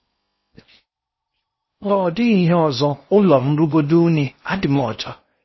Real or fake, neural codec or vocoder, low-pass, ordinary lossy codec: fake; codec, 16 kHz in and 24 kHz out, 0.6 kbps, FocalCodec, streaming, 4096 codes; 7.2 kHz; MP3, 24 kbps